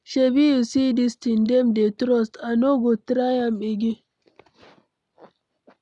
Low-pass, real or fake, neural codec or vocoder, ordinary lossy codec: 10.8 kHz; real; none; none